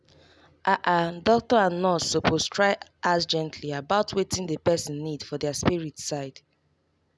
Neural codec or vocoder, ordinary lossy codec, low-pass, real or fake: none; none; none; real